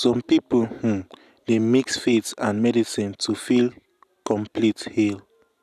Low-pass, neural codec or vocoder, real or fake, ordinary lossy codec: 14.4 kHz; none; real; none